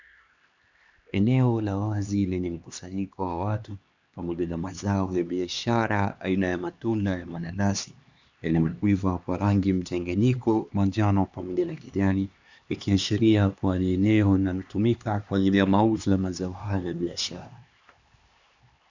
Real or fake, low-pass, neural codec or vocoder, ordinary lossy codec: fake; 7.2 kHz; codec, 16 kHz, 2 kbps, X-Codec, HuBERT features, trained on LibriSpeech; Opus, 64 kbps